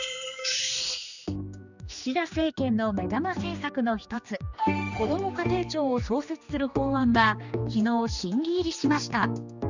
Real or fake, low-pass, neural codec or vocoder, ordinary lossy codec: fake; 7.2 kHz; codec, 16 kHz, 2 kbps, X-Codec, HuBERT features, trained on general audio; none